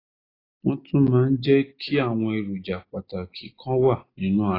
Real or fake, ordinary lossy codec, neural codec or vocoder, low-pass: real; AAC, 24 kbps; none; 5.4 kHz